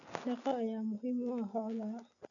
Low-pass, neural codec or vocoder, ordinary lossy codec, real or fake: 7.2 kHz; none; none; real